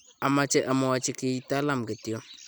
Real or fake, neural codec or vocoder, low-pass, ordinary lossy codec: real; none; none; none